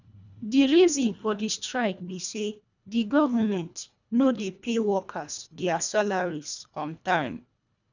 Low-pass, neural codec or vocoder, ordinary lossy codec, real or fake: 7.2 kHz; codec, 24 kHz, 1.5 kbps, HILCodec; none; fake